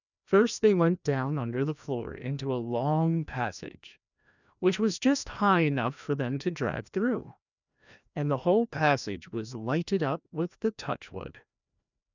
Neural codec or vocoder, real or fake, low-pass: codec, 16 kHz, 1 kbps, FreqCodec, larger model; fake; 7.2 kHz